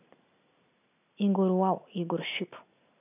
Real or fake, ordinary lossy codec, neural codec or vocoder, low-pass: real; none; none; 3.6 kHz